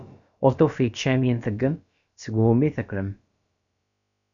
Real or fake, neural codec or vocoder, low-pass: fake; codec, 16 kHz, about 1 kbps, DyCAST, with the encoder's durations; 7.2 kHz